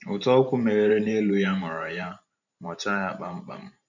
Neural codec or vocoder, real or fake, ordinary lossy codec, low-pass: vocoder, 44.1 kHz, 128 mel bands every 512 samples, BigVGAN v2; fake; none; 7.2 kHz